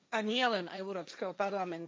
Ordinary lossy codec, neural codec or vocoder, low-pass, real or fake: none; codec, 16 kHz, 1.1 kbps, Voila-Tokenizer; none; fake